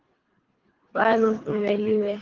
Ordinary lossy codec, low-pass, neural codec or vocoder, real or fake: Opus, 16 kbps; 7.2 kHz; codec, 24 kHz, 3 kbps, HILCodec; fake